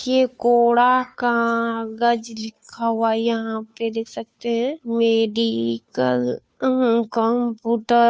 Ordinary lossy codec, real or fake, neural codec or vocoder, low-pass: none; fake; codec, 16 kHz, 2 kbps, FunCodec, trained on Chinese and English, 25 frames a second; none